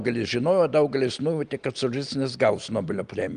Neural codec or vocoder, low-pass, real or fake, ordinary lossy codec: none; 9.9 kHz; real; Opus, 32 kbps